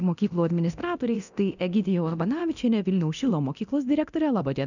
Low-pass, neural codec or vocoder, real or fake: 7.2 kHz; codec, 24 kHz, 0.9 kbps, DualCodec; fake